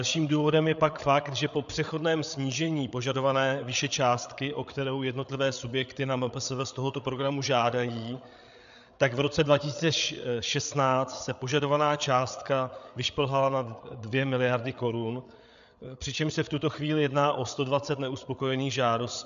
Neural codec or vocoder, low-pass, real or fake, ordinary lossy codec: codec, 16 kHz, 8 kbps, FreqCodec, larger model; 7.2 kHz; fake; AAC, 96 kbps